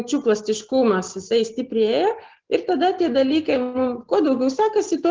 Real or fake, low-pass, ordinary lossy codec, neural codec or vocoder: real; 7.2 kHz; Opus, 16 kbps; none